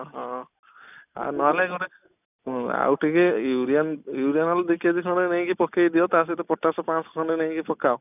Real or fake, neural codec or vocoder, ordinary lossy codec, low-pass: real; none; none; 3.6 kHz